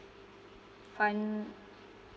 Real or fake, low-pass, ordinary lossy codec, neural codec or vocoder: real; none; none; none